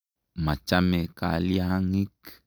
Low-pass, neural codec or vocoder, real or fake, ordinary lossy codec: none; none; real; none